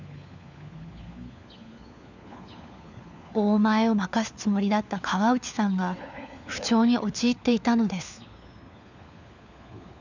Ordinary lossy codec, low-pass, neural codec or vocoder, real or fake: none; 7.2 kHz; codec, 16 kHz, 4 kbps, FunCodec, trained on LibriTTS, 50 frames a second; fake